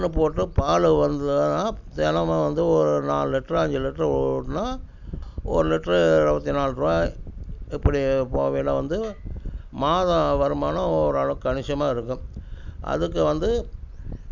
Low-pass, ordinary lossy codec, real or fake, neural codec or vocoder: 7.2 kHz; none; real; none